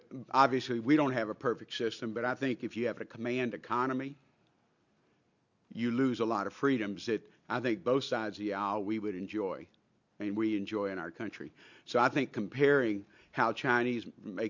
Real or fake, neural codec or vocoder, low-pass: real; none; 7.2 kHz